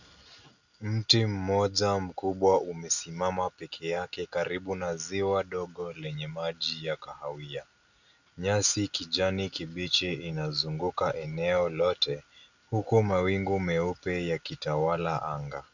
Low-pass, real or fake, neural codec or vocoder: 7.2 kHz; real; none